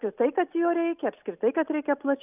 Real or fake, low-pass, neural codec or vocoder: real; 3.6 kHz; none